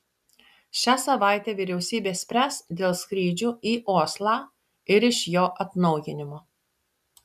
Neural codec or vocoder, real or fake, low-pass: none; real; 14.4 kHz